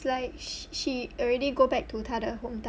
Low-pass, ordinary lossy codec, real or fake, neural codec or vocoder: none; none; real; none